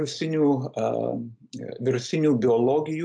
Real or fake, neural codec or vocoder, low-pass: real; none; 9.9 kHz